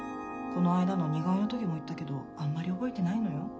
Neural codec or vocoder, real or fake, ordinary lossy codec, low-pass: none; real; none; none